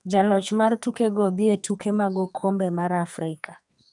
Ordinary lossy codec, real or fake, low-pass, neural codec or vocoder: none; fake; 10.8 kHz; codec, 44.1 kHz, 2.6 kbps, SNAC